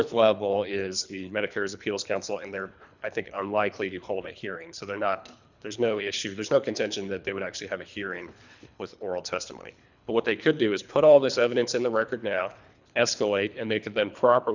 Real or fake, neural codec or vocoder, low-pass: fake; codec, 24 kHz, 3 kbps, HILCodec; 7.2 kHz